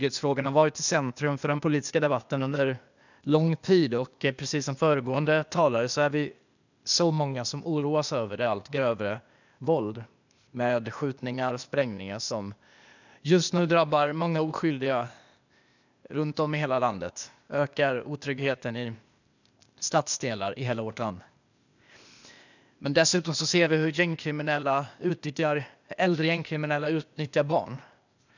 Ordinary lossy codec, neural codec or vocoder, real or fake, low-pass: none; codec, 16 kHz, 0.8 kbps, ZipCodec; fake; 7.2 kHz